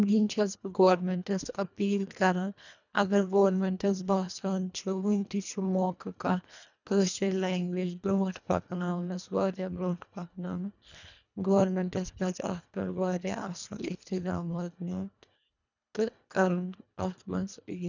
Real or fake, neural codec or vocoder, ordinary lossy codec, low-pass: fake; codec, 24 kHz, 1.5 kbps, HILCodec; none; 7.2 kHz